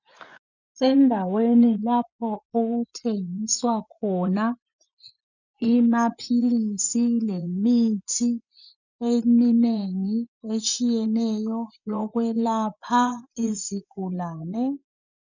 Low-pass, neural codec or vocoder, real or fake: 7.2 kHz; vocoder, 24 kHz, 100 mel bands, Vocos; fake